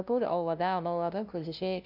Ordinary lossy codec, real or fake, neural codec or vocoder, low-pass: none; fake; codec, 16 kHz, 0.5 kbps, FunCodec, trained on LibriTTS, 25 frames a second; 5.4 kHz